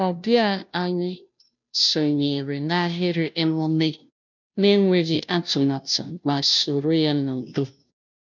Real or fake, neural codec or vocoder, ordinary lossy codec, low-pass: fake; codec, 16 kHz, 0.5 kbps, FunCodec, trained on Chinese and English, 25 frames a second; none; 7.2 kHz